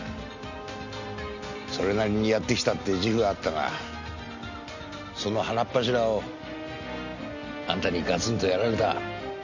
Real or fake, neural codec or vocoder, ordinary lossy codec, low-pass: real; none; none; 7.2 kHz